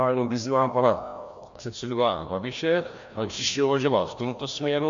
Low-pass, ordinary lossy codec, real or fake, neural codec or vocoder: 7.2 kHz; MP3, 64 kbps; fake; codec, 16 kHz, 1 kbps, FreqCodec, larger model